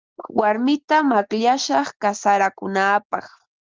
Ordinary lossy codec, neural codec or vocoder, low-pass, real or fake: Opus, 32 kbps; none; 7.2 kHz; real